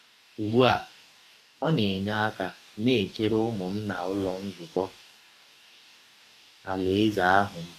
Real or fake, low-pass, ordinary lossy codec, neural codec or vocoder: fake; 14.4 kHz; MP3, 96 kbps; codec, 44.1 kHz, 2.6 kbps, DAC